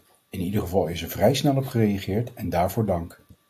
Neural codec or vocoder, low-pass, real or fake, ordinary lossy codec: none; 14.4 kHz; real; AAC, 64 kbps